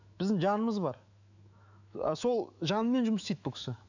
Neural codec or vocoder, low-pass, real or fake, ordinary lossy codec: autoencoder, 48 kHz, 128 numbers a frame, DAC-VAE, trained on Japanese speech; 7.2 kHz; fake; none